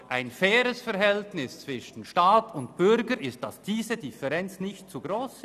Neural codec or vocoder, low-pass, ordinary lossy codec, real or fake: none; 14.4 kHz; none; real